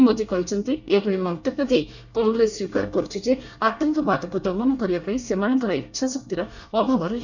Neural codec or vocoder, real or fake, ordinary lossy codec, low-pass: codec, 24 kHz, 1 kbps, SNAC; fake; none; 7.2 kHz